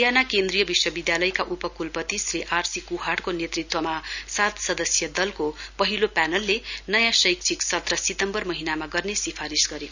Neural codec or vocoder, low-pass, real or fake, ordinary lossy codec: none; 7.2 kHz; real; none